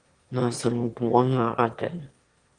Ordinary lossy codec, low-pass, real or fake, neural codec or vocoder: Opus, 24 kbps; 9.9 kHz; fake; autoencoder, 22.05 kHz, a latent of 192 numbers a frame, VITS, trained on one speaker